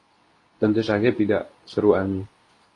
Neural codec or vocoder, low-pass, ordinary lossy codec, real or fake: codec, 24 kHz, 0.9 kbps, WavTokenizer, medium speech release version 2; 10.8 kHz; AAC, 32 kbps; fake